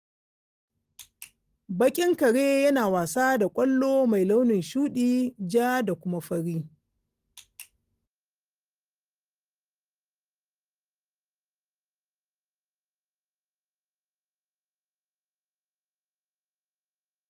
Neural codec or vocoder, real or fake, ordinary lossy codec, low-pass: none; real; Opus, 24 kbps; 14.4 kHz